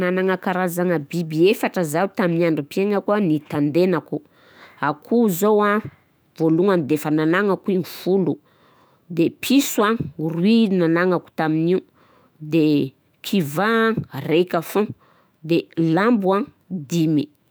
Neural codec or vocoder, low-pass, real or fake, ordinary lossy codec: autoencoder, 48 kHz, 128 numbers a frame, DAC-VAE, trained on Japanese speech; none; fake; none